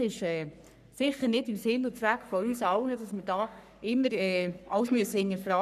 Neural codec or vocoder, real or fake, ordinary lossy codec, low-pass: codec, 44.1 kHz, 3.4 kbps, Pupu-Codec; fake; none; 14.4 kHz